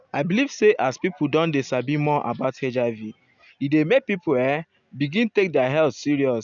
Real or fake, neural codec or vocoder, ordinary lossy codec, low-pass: real; none; none; 7.2 kHz